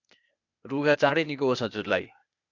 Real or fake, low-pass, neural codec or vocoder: fake; 7.2 kHz; codec, 16 kHz, 0.8 kbps, ZipCodec